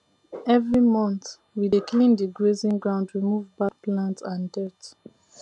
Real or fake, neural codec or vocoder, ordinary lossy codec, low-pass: real; none; none; 10.8 kHz